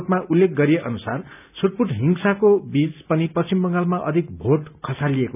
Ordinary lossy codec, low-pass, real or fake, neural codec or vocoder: none; 3.6 kHz; real; none